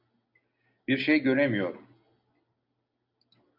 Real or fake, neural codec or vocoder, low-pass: real; none; 5.4 kHz